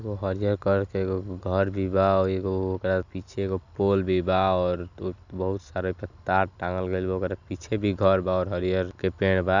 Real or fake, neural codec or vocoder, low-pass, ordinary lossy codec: real; none; 7.2 kHz; none